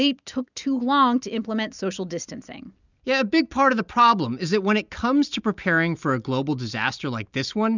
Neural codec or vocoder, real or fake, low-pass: none; real; 7.2 kHz